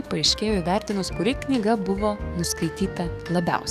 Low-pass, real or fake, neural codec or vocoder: 14.4 kHz; fake; codec, 44.1 kHz, 7.8 kbps, DAC